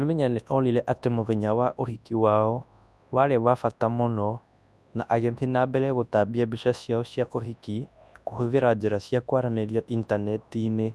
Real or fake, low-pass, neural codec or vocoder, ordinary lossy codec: fake; none; codec, 24 kHz, 0.9 kbps, WavTokenizer, large speech release; none